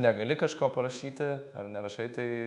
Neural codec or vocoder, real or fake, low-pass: codec, 24 kHz, 1.2 kbps, DualCodec; fake; 10.8 kHz